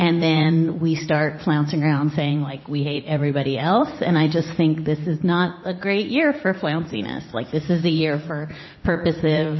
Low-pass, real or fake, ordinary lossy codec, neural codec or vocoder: 7.2 kHz; fake; MP3, 24 kbps; vocoder, 44.1 kHz, 80 mel bands, Vocos